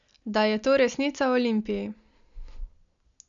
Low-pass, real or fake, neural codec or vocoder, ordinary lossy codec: 7.2 kHz; real; none; none